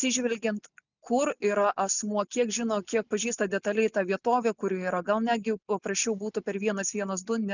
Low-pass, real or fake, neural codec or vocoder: 7.2 kHz; real; none